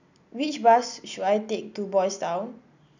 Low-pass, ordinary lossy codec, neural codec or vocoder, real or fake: 7.2 kHz; none; none; real